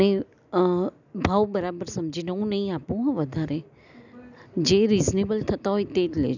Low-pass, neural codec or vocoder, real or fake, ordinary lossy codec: 7.2 kHz; none; real; none